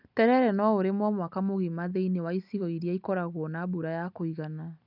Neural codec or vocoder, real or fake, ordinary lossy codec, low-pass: none; real; none; 5.4 kHz